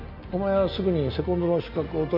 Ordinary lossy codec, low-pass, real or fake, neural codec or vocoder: MP3, 48 kbps; 5.4 kHz; real; none